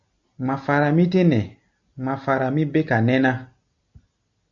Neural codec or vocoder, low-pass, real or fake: none; 7.2 kHz; real